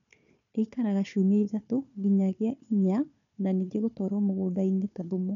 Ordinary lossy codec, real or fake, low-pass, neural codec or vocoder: none; fake; 7.2 kHz; codec, 16 kHz, 4 kbps, FunCodec, trained on Chinese and English, 50 frames a second